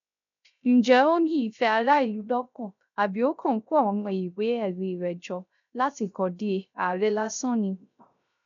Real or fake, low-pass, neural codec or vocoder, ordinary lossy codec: fake; 7.2 kHz; codec, 16 kHz, 0.3 kbps, FocalCodec; none